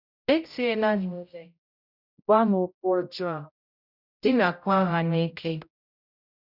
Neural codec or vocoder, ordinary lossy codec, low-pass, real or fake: codec, 16 kHz, 0.5 kbps, X-Codec, HuBERT features, trained on general audio; none; 5.4 kHz; fake